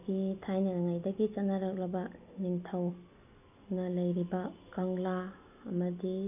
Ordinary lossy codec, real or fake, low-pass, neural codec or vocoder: none; real; 3.6 kHz; none